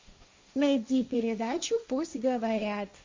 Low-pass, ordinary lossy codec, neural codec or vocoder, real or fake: 7.2 kHz; MP3, 48 kbps; codec, 16 kHz, 1.1 kbps, Voila-Tokenizer; fake